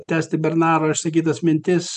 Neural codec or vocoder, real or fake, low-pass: none; real; 10.8 kHz